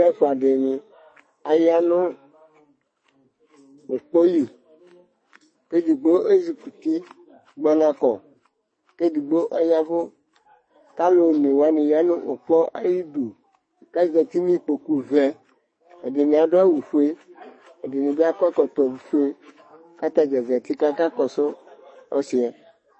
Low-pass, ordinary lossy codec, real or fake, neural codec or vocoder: 9.9 kHz; MP3, 32 kbps; fake; codec, 44.1 kHz, 2.6 kbps, SNAC